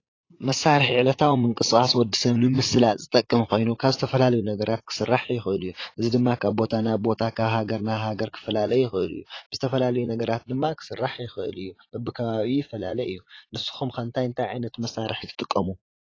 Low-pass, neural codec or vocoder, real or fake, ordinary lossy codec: 7.2 kHz; vocoder, 44.1 kHz, 80 mel bands, Vocos; fake; AAC, 32 kbps